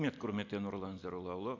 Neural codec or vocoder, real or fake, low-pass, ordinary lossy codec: none; real; 7.2 kHz; AAC, 48 kbps